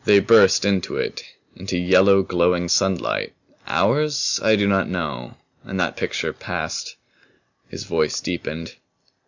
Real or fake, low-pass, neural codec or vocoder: real; 7.2 kHz; none